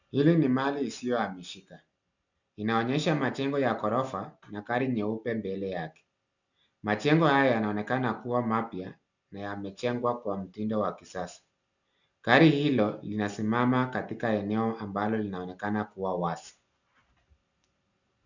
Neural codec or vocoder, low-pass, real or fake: none; 7.2 kHz; real